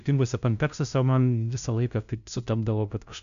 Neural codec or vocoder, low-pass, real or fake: codec, 16 kHz, 0.5 kbps, FunCodec, trained on LibriTTS, 25 frames a second; 7.2 kHz; fake